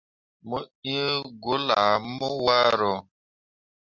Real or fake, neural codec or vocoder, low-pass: real; none; 7.2 kHz